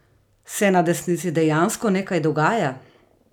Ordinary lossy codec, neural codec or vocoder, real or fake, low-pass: none; none; real; 19.8 kHz